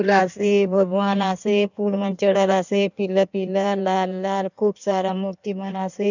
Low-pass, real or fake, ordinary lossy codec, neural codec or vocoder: 7.2 kHz; fake; none; codec, 16 kHz in and 24 kHz out, 1.1 kbps, FireRedTTS-2 codec